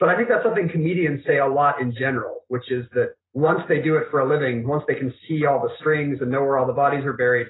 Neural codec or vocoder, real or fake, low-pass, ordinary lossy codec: none; real; 7.2 kHz; AAC, 16 kbps